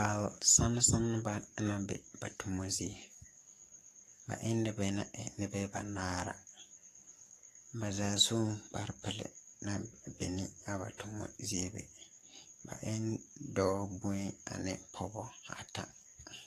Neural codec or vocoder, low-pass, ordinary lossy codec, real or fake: codec, 44.1 kHz, 7.8 kbps, DAC; 14.4 kHz; AAC, 48 kbps; fake